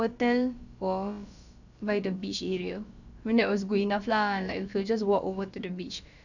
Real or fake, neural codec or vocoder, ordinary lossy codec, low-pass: fake; codec, 16 kHz, about 1 kbps, DyCAST, with the encoder's durations; none; 7.2 kHz